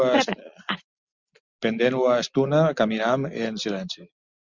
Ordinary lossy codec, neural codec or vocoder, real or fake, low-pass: Opus, 64 kbps; none; real; 7.2 kHz